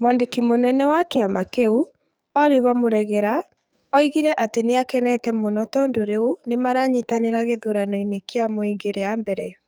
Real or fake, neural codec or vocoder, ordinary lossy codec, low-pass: fake; codec, 44.1 kHz, 2.6 kbps, SNAC; none; none